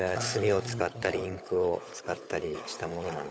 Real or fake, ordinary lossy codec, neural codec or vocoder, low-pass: fake; none; codec, 16 kHz, 16 kbps, FunCodec, trained on LibriTTS, 50 frames a second; none